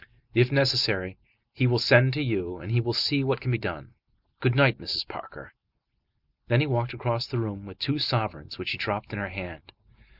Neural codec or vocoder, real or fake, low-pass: none; real; 5.4 kHz